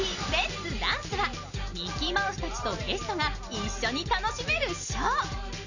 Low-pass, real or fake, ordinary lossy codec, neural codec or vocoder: 7.2 kHz; real; none; none